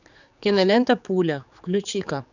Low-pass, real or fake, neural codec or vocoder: 7.2 kHz; fake; codec, 16 kHz, 4 kbps, X-Codec, HuBERT features, trained on general audio